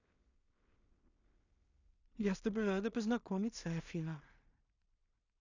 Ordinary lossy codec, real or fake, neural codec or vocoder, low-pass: none; fake; codec, 16 kHz in and 24 kHz out, 0.4 kbps, LongCat-Audio-Codec, two codebook decoder; 7.2 kHz